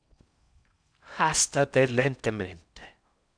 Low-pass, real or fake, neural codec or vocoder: 9.9 kHz; fake; codec, 16 kHz in and 24 kHz out, 0.6 kbps, FocalCodec, streaming, 4096 codes